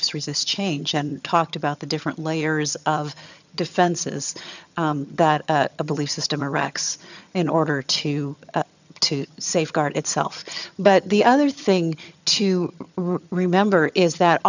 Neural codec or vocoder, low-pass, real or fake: vocoder, 22.05 kHz, 80 mel bands, HiFi-GAN; 7.2 kHz; fake